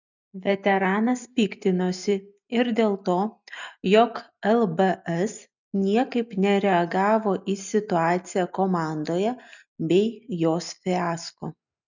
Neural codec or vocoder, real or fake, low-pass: none; real; 7.2 kHz